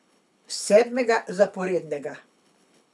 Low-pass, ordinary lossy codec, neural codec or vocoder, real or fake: none; none; codec, 24 kHz, 6 kbps, HILCodec; fake